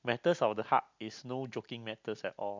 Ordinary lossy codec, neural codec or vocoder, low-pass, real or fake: MP3, 64 kbps; none; 7.2 kHz; real